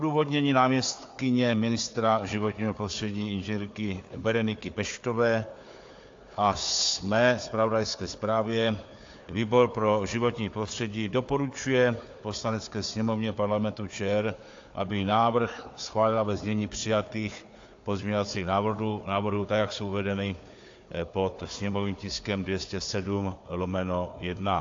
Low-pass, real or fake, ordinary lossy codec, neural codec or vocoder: 7.2 kHz; fake; AAC, 48 kbps; codec, 16 kHz, 4 kbps, FunCodec, trained on Chinese and English, 50 frames a second